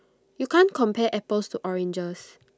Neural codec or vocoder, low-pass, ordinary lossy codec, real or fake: none; none; none; real